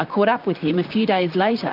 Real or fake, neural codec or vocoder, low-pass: fake; vocoder, 44.1 kHz, 128 mel bands, Pupu-Vocoder; 5.4 kHz